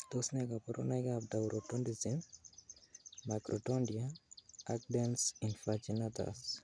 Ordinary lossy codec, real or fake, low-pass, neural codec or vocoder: none; real; 9.9 kHz; none